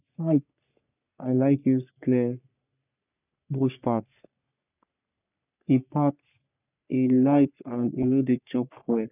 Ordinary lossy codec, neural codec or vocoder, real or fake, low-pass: none; codec, 44.1 kHz, 3.4 kbps, Pupu-Codec; fake; 3.6 kHz